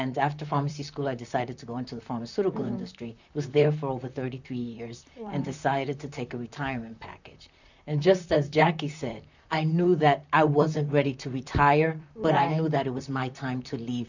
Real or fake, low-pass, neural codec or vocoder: fake; 7.2 kHz; vocoder, 44.1 kHz, 128 mel bands, Pupu-Vocoder